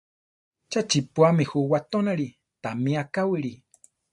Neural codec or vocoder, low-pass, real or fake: none; 10.8 kHz; real